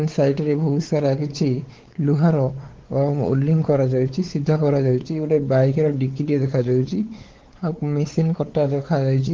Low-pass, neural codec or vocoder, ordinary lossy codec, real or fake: 7.2 kHz; codec, 16 kHz, 4 kbps, X-Codec, WavLM features, trained on Multilingual LibriSpeech; Opus, 16 kbps; fake